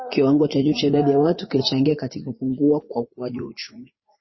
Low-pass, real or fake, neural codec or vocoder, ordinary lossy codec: 7.2 kHz; fake; vocoder, 44.1 kHz, 80 mel bands, Vocos; MP3, 24 kbps